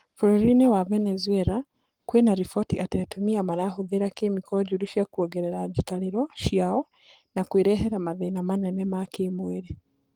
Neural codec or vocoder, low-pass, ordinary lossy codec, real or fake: codec, 44.1 kHz, 7.8 kbps, Pupu-Codec; 19.8 kHz; Opus, 24 kbps; fake